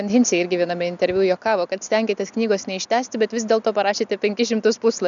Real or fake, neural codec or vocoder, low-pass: real; none; 7.2 kHz